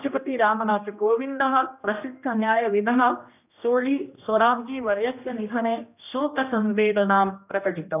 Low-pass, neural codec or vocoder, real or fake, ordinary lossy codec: 3.6 kHz; codec, 16 kHz, 1 kbps, X-Codec, HuBERT features, trained on general audio; fake; none